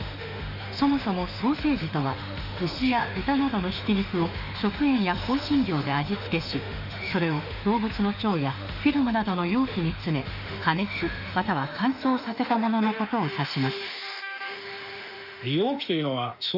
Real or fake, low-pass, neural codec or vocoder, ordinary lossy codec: fake; 5.4 kHz; autoencoder, 48 kHz, 32 numbers a frame, DAC-VAE, trained on Japanese speech; none